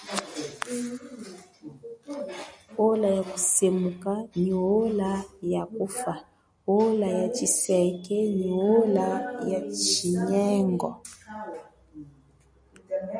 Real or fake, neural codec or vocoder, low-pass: real; none; 9.9 kHz